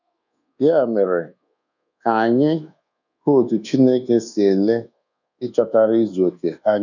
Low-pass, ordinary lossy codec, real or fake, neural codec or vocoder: 7.2 kHz; none; fake; codec, 24 kHz, 1.2 kbps, DualCodec